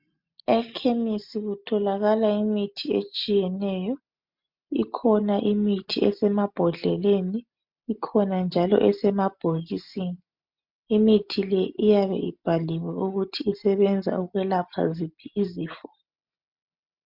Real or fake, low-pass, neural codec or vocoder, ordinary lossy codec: real; 5.4 kHz; none; MP3, 48 kbps